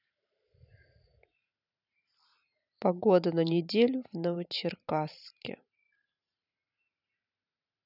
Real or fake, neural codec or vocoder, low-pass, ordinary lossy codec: fake; vocoder, 44.1 kHz, 128 mel bands every 512 samples, BigVGAN v2; 5.4 kHz; none